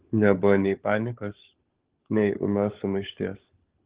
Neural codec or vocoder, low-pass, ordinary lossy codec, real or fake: codec, 16 kHz, 16 kbps, FunCodec, trained on LibriTTS, 50 frames a second; 3.6 kHz; Opus, 16 kbps; fake